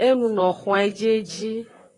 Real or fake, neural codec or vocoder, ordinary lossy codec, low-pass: fake; vocoder, 44.1 kHz, 128 mel bands, Pupu-Vocoder; AAC, 32 kbps; 10.8 kHz